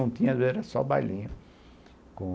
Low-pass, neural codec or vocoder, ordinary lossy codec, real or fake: none; none; none; real